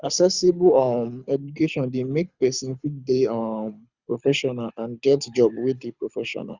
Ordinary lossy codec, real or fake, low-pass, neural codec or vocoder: Opus, 64 kbps; fake; 7.2 kHz; codec, 24 kHz, 6 kbps, HILCodec